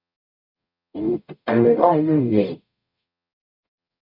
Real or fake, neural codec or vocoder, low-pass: fake; codec, 44.1 kHz, 0.9 kbps, DAC; 5.4 kHz